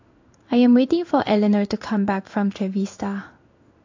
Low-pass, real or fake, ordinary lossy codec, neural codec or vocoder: 7.2 kHz; fake; AAC, 48 kbps; codec, 16 kHz in and 24 kHz out, 1 kbps, XY-Tokenizer